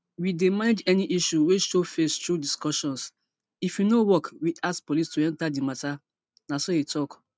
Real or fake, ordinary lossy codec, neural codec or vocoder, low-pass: real; none; none; none